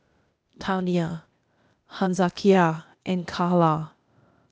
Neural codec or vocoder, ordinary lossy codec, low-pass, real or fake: codec, 16 kHz, 0.8 kbps, ZipCodec; none; none; fake